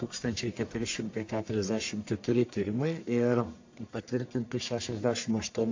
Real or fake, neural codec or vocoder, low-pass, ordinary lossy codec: fake; codec, 44.1 kHz, 1.7 kbps, Pupu-Codec; 7.2 kHz; AAC, 48 kbps